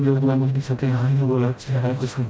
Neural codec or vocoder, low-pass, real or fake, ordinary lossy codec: codec, 16 kHz, 0.5 kbps, FreqCodec, smaller model; none; fake; none